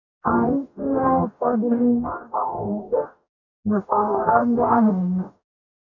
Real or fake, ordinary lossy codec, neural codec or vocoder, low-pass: fake; none; codec, 44.1 kHz, 0.9 kbps, DAC; 7.2 kHz